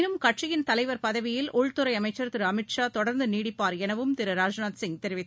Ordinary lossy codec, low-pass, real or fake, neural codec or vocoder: none; none; real; none